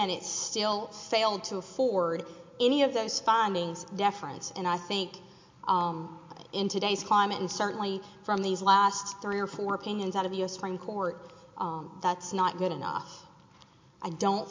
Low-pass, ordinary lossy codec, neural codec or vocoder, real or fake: 7.2 kHz; MP3, 48 kbps; none; real